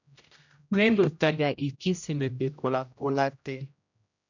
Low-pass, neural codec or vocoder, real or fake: 7.2 kHz; codec, 16 kHz, 0.5 kbps, X-Codec, HuBERT features, trained on general audio; fake